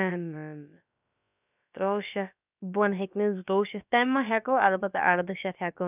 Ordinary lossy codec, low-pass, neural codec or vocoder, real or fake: none; 3.6 kHz; codec, 16 kHz, about 1 kbps, DyCAST, with the encoder's durations; fake